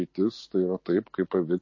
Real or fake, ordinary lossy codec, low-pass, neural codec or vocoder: real; MP3, 32 kbps; 7.2 kHz; none